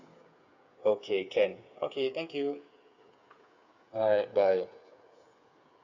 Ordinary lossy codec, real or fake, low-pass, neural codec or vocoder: none; fake; 7.2 kHz; codec, 16 kHz, 8 kbps, FreqCodec, smaller model